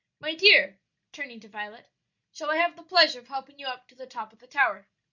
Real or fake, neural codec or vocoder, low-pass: real; none; 7.2 kHz